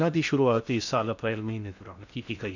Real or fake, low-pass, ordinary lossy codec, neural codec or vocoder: fake; 7.2 kHz; none; codec, 16 kHz in and 24 kHz out, 0.6 kbps, FocalCodec, streaming, 2048 codes